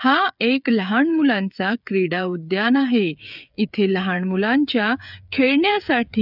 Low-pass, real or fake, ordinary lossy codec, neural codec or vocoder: 5.4 kHz; fake; none; codec, 16 kHz, 8 kbps, FreqCodec, smaller model